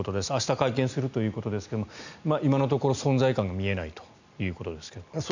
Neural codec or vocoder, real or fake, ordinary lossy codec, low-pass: none; real; none; 7.2 kHz